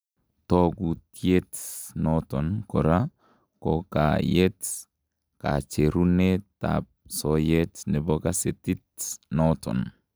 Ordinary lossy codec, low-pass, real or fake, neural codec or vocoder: none; none; real; none